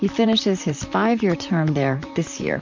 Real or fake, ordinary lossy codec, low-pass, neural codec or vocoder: fake; MP3, 64 kbps; 7.2 kHz; vocoder, 44.1 kHz, 128 mel bands, Pupu-Vocoder